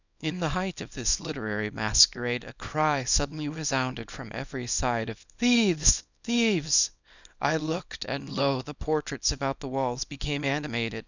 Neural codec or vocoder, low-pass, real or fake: codec, 24 kHz, 0.9 kbps, WavTokenizer, small release; 7.2 kHz; fake